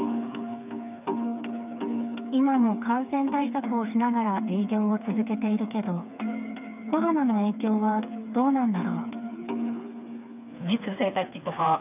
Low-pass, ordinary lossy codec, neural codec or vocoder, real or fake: 3.6 kHz; none; codec, 16 kHz, 4 kbps, FreqCodec, smaller model; fake